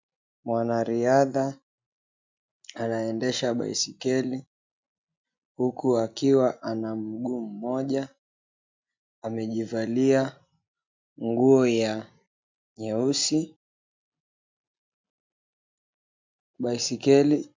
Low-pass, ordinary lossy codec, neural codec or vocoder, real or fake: 7.2 kHz; MP3, 64 kbps; none; real